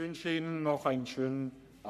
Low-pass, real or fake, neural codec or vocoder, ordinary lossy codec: 14.4 kHz; fake; codec, 44.1 kHz, 3.4 kbps, Pupu-Codec; none